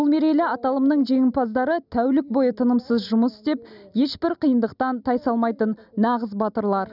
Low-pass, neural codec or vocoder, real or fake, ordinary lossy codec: 5.4 kHz; none; real; none